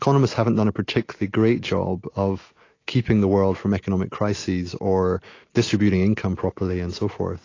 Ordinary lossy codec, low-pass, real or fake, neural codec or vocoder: AAC, 32 kbps; 7.2 kHz; real; none